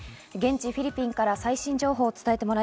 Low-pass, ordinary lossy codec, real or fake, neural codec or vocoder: none; none; real; none